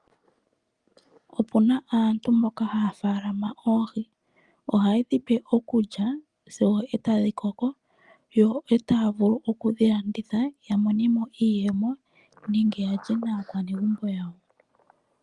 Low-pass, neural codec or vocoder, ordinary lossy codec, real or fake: 10.8 kHz; none; Opus, 32 kbps; real